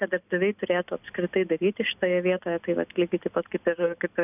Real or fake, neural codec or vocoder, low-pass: real; none; 3.6 kHz